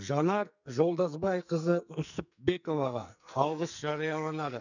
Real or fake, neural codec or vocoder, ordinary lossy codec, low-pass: fake; codec, 44.1 kHz, 2.6 kbps, SNAC; none; 7.2 kHz